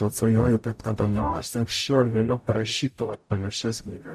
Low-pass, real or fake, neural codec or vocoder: 14.4 kHz; fake; codec, 44.1 kHz, 0.9 kbps, DAC